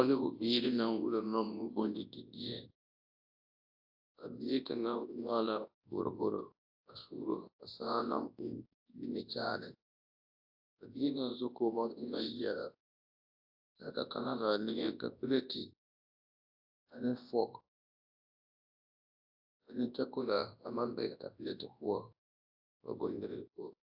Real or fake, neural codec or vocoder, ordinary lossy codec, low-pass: fake; codec, 24 kHz, 0.9 kbps, WavTokenizer, large speech release; AAC, 48 kbps; 5.4 kHz